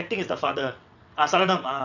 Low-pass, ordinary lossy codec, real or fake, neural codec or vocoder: 7.2 kHz; none; fake; vocoder, 22.05 kHz, 80 mel bands, WaveNeXt